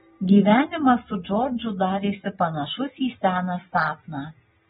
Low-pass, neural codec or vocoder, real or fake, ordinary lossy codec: 14.4 kHz; none; real; AAC, 16 kbps